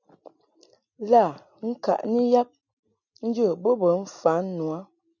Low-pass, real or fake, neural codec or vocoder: 7.2 kHz; real; none